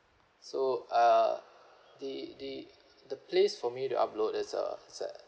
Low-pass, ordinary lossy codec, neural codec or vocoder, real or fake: none; none; none; real